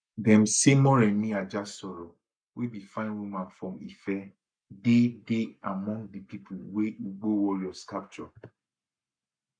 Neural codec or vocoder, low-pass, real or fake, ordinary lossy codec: codec, 44.1 kHz, 7.8 kbps, Pupu-Codec; 9.9 kHz; fake; none